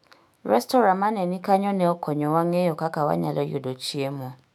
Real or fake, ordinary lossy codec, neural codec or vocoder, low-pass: fake; none; autoencoder, 48 kHz, 128 numbers a frame, DAC-VAE, trained on Japanese speech; 19.8 kHz